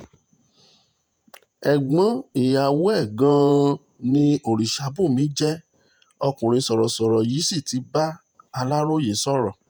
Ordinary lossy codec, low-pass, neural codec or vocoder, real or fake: none; none; vocoder, 48 kHz, 128 mel bands, Vocos; fake